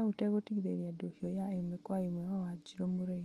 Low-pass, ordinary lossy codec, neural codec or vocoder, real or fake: 14.4 kHz; Opus, 32 kbps; none; real